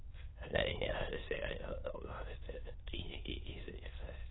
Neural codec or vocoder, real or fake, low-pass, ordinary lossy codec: autoencoder, 22.05 kHz, a latent of 192 numbers a frame, VITS, trained on many speakers; fake; 7.2 kHz; AAC, 16 kbps